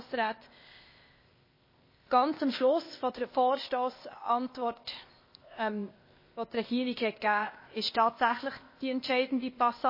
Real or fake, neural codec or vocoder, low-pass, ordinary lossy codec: fake; codec, 16 kHz, 0.8 kbps, ZipCodec; 5.4 kHz; MP3, 24 kbps